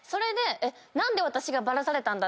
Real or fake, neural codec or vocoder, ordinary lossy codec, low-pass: real; none; none; none